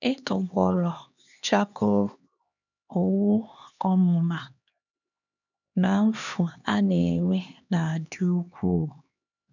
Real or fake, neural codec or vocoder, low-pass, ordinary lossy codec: fake; codec, 16 kHz, 2 kbps, X-Codec, HuBERT features, trained on LibriSpeech; 7.2 kHz; none